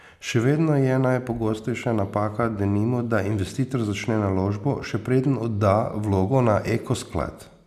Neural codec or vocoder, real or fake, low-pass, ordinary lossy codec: none; real; 14.4 kHz; none